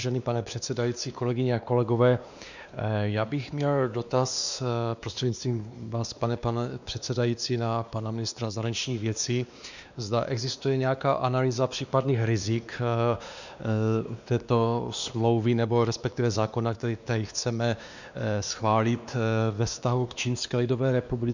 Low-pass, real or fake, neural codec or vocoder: 7.2 kHz; fake; codec, 16 kHz, 2 kbps, X-Codec, WavLM features, trained on Multilingual LibriSpeech